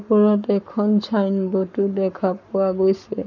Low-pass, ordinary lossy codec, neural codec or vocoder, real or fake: 7.2 kHz; none; codec, 44.1 kHz, 7.8 kbps, Pupu-Codec; fake